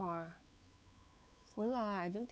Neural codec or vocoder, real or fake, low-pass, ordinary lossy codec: codec, 16 kHz, 4 kbps, X-Codec, WavLM features, trained on Multilingual LibriSpeech; fake; none; none